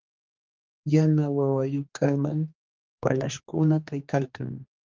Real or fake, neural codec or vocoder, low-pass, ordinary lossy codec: fake; codec, 16 kHz, 2 kbps, X-Codec, HuBERT features, trained on general audio; 7.2 kHz; Opus, 32 kbps